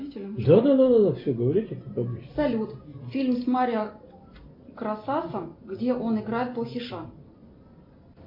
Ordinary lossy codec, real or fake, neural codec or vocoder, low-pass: MP3, 48 kbps; real; none; 5.4 kHz